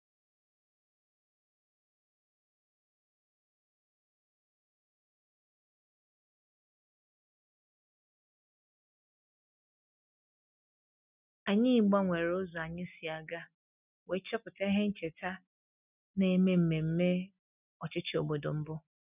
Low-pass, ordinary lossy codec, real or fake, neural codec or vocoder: 3.6 kHz; none; real; none